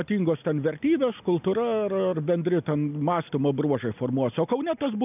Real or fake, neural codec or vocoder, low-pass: real; none; 3.6 kHz